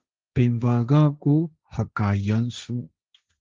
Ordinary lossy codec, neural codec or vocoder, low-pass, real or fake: Opus, 32 kbps; codec, 16 kHz, 1.1 kbps, Voila-Tokenizer; 7.2 kHz; fake